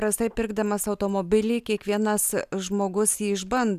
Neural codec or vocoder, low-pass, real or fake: none; 14.4 kHz; real